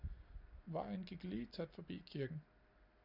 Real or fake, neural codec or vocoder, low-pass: real; none; 5.4 kHz